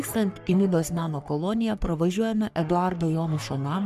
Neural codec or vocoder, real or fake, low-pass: codec, 44.1 kHz, 3.4 kbps, Pupu-Codec; fake; 14.4 kHz